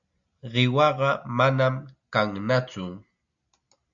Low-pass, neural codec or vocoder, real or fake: 7.2 kHz; none; real